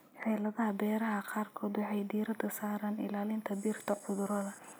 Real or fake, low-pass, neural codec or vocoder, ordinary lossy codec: real; none; none; none